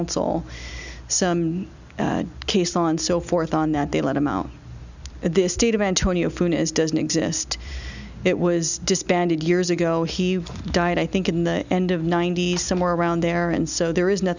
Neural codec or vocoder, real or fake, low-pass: none; real; 7.2 kHz